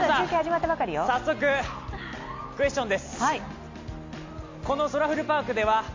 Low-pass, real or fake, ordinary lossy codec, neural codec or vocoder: 7.2 kHz; real; MP3, 48 kbps; none